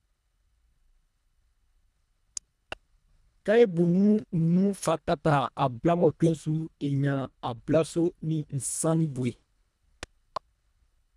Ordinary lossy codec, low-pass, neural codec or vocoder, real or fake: none; none; codec, 24 kHz, 1.5 kbps, HILCodec; fake